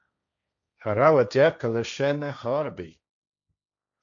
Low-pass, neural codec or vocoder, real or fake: 7.2 kHz; codec, 16 kHz, 1.1 kbps, Voila-Tokenizer; fake